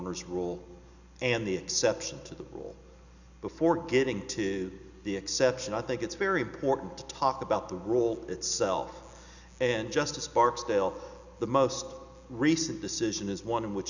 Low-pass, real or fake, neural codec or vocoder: 7.2 kHz; real; none